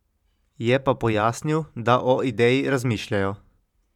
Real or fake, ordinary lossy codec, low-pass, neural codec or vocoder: fake; none; 19.8 kHz; vocoder, 44.1 kHz, 128 mel bands every 512 samples, BigVGAN v2